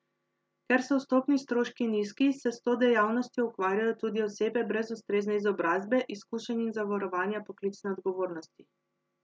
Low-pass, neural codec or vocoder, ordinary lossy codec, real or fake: none; none; none; real